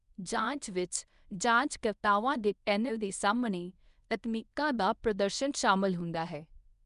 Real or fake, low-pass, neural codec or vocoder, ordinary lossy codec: fake; 10.8 kHz; codec, 24 kHz, 0.9 kbps, WavTokenizer, medium speech release version 1; none